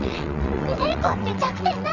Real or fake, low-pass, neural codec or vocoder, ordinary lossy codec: fake; 7.2 kHz; vocoder, 22.05 kHz, 80 mel bands, WaveNeXt; none